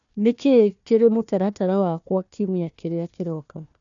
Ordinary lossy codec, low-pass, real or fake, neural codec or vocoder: none; 7.2 kHz; fake; codec, 16 kHz, 1 kbps, FunCodec, trained on Chinese and English, 50 frames a second